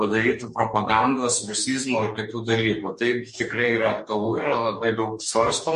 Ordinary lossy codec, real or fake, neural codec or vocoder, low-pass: MP3, 48 kbps; fake; codec, 44.1 kHz, 2.6 kbps, DAC; 14.4 kHz